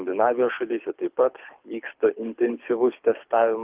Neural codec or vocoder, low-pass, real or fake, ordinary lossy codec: codec, 24 kHz, 6 kbps, HILCodec; 3.6 kHz; fake; Opus, 32 kbps